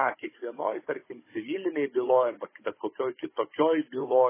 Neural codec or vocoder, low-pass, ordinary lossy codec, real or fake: codec, 16 kHz, 16 kbps, FunCodec, trained on Chinese and English, 50 frames a second; 3.6 kHz; MP3, 16 kbps; fake